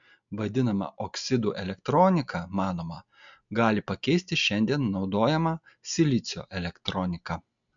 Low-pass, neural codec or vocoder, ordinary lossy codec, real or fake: 7.2 kHz; none; MP3, 64 kbps; real